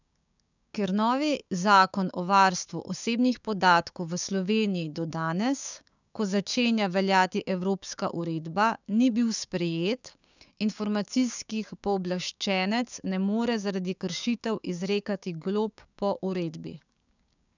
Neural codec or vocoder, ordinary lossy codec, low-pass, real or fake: codec, 16 kHz, 6 kbps, DAC; none; 7.2 kHz; fake